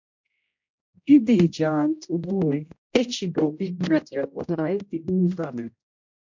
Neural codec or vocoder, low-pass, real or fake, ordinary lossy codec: codec, 16 kHz, 0.5 kbps, X-Codec, HuBERT features, trained on general audio; 7.2 kHz; fake; MP3, 64 kbps